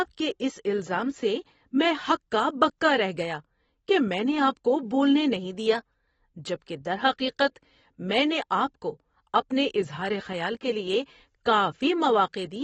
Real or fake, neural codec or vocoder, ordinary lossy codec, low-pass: real; none; AAC, 24 kbps; 19.8 kHz